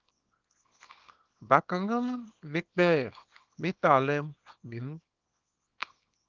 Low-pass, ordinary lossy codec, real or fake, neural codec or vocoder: 7.2 kHz; Opus, 24 kbps; fake; codec, 24 kHz, 0.9 kbps, WavTokenizer, small release